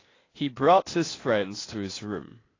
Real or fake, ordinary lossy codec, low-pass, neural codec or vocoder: fake; AAC, 32 kbps; 7.2 kHz; codec, 16 kHz, 0.8 kbps, ZipCodec